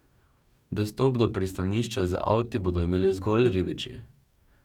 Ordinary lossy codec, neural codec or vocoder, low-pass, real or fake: none; codec, 44.1 kHz, 2.6 kbps, DAC; 19.8 kHz; fake